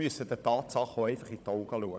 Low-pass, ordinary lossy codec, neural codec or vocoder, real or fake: none; none; codec, 16 kHz, 16 kbps, FunCodec, trained on Chinese and English, 50 frames a second; fake